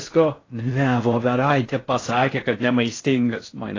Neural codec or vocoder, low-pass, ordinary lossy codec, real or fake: codec, 16 kHz in and 24 kHz out, 0.6 kbps, FocalCodec, streaming, 4096 codes; 7.2 kHz; AAC, 32 kbps; fake